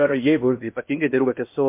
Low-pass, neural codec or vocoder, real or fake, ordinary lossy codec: 3.6 kHz; codec, 16 kHz in and 24 kHz out, 0.6 kbps, FocalCodec, streaming, 4096 codes; fake; MP3, 32 kbps